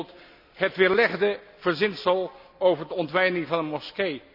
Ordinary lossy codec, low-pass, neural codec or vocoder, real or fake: none; 5.4 kHz; none; real